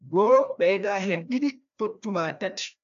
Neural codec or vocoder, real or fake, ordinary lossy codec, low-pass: codec, 16 kHz, 1 kbps, FreqCodec, larger model; fake; none; 7.2 kHz